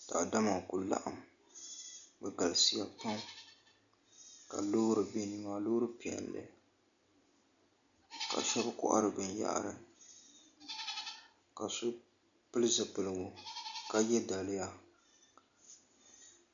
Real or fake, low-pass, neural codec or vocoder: real; 7.2 kHz; none